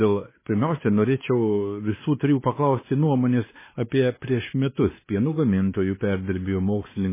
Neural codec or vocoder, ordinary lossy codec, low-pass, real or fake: codec, 16 kHz, 2 kbps, X-Codec, WavLM features, trained on Multilingual LibriSpeech; MP3, 16 kbps; 3.6 kHz; fake